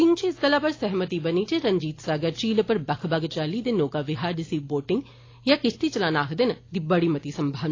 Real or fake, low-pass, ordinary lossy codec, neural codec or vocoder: real; 7.2 kHz; AAC, 32 kbps; none